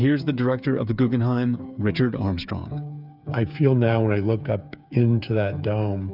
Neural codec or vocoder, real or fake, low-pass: codec, 16 kHz, 8 kbps, FreqCodec, smaller model; fake; 5.4 kHz